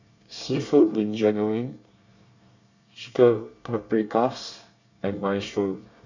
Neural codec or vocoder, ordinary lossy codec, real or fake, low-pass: codec, 24 kHz, 1 kbps, SNAC; none; fake; 7.2 kHz